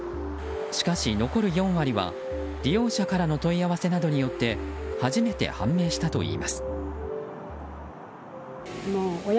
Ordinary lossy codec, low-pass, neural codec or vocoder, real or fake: none; none; none; real